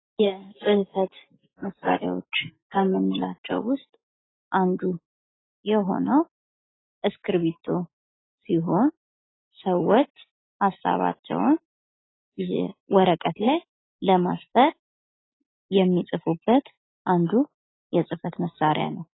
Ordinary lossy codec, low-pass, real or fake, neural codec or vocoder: AAC, 16 kbps; 7.2 kHz; real; none